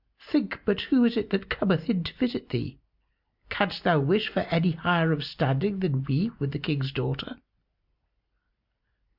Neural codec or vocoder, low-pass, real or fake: none; 5.4 kHz; real